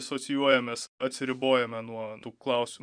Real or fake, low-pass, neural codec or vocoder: fake; 9.9 kHz; vocoder, 24 kHz, 100 mel bands, Vocos